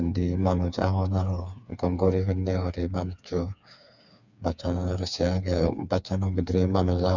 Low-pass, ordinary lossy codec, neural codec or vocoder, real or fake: 7.2 kHz; none; codec, 16 kHz, 4 kbps, FreqCodec, smaller model; fake